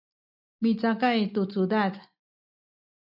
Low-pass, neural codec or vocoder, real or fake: 5.4 kHz; none; real